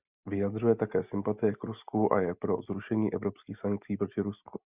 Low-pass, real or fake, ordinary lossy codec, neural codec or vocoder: 3.6 kHz; real; Opus, 64 kbps; none